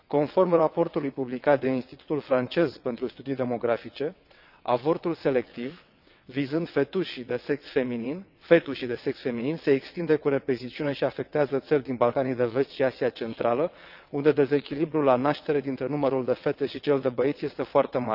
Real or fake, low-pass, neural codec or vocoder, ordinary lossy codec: fake; 5.4 kHz; vocoder, 22.05 kHz, 80 mel bands, WaveNeXt; none